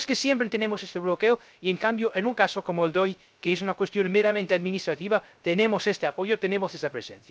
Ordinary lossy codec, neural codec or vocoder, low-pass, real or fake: none; codec, 16 kHz, 0.3 kbps, FocalCodec; none; fake